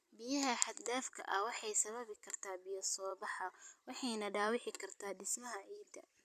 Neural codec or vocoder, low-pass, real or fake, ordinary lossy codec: vocoder, 44.1 kHz, 128 mel bands every 256 samples, BigVGAN v2; 14.4 kHz; fake; MP3, 96 kbps